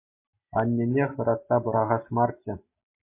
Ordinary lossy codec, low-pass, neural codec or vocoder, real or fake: MP3, 24 kbps; 3.6 kHz; none; real